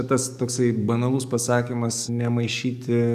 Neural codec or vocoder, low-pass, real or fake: codec, 44.1 kHz, 7.8 kbps, DAC; 14.4 kHz; fake